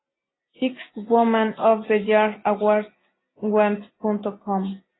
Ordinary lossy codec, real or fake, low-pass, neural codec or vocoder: AAC, 16 kbps; real; 7.2 kHz; none